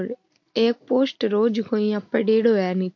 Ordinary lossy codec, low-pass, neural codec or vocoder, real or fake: MP3, 48 kbps; 7.2 kHz; none; real